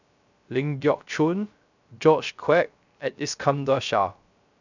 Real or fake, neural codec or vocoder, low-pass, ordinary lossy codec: fake; codec, 16 kHz, 0.3 kbps, FocalCodec; 7.2 kHz; none